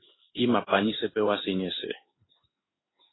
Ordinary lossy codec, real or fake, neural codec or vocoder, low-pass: AAC, 16 kbps; fake; codec, 16 kHz in and 24 kHz out, 1 kbps, XY-Tokenizer; 7.2 kHz